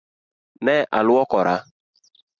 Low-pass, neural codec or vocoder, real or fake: 7.2 kHz; none; real